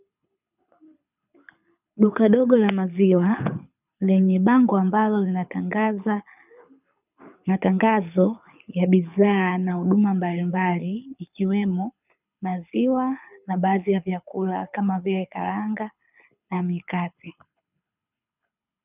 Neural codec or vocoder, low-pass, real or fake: codec, 24 kHz, 6 kbps, HILCodec; 3.6 kHz; fake